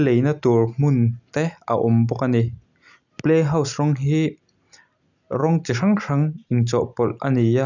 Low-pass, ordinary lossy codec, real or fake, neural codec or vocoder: 7.2 kHz; none; real; none